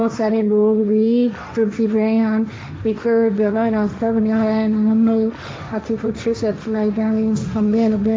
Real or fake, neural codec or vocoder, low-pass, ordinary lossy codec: fake; codec, 16 kHz, 1.1 kbps, Voila-Tokenizer; none; none